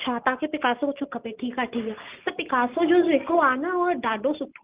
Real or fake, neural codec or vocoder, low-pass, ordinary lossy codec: real; none; 3.6 kHz; Opus, 32 kbps